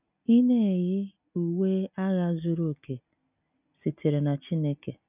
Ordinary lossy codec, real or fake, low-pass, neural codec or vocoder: none; real; 3.6 kHz; none